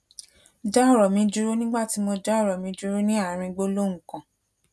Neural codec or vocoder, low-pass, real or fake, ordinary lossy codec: none; none; real; none